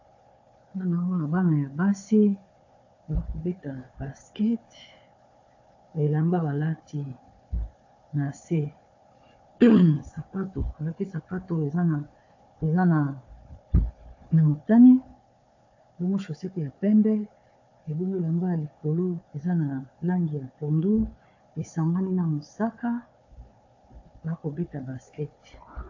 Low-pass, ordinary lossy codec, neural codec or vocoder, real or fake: 7.2 kHz; AAC, 48 kbps; codec, 16 kHz, 4 kbps, FunCodec, trained on Chinese and English, 50 frames a second; fake